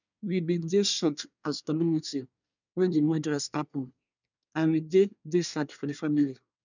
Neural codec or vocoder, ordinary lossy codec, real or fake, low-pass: codec, 24 kHz, 1 kbps, SNAC; none; fake; 7.2 kHz